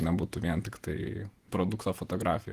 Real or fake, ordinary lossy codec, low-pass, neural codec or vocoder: fake; Opus, 24 kbps; 14.4 kHz; vocoder, 48 kHz, 128 mel bands, Vocos